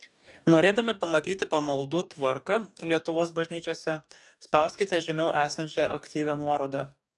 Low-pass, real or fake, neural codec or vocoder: 10.8 kHz; fake; codec, 44.1 kHz, 2.6 kbps, DAC